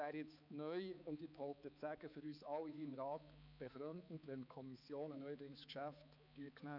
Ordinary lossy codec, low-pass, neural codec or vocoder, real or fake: none; 5.4 kHz; codec, 16 kHz, 4 kbps, X-Codec, HuBERT features, trained on balanced general audio; fake